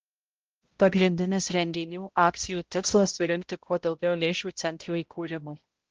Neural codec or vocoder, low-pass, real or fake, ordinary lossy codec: codec, 16 kHz, 0.5 kbps, X-Codec, HuBERT features, trained on balanced general audio; 7.2 kHz; fake; Opus, 16 kbps